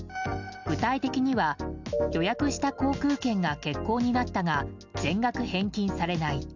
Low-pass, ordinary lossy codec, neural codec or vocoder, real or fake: 7.2 kHz; none; none; real